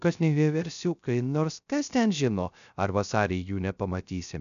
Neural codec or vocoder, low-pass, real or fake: codec, 16 kHz, 0.3 kbps, FocalCodec; 7.2 kHz; fake